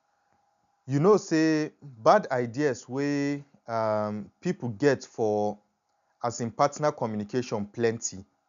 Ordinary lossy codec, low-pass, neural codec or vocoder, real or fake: MP3, 96 kbps; 7.2 kHz; none; real